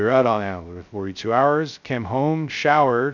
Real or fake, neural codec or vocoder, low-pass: fake; codec, 16 kHz, 0.2 kbps, FocalCodec; 7.2 kHz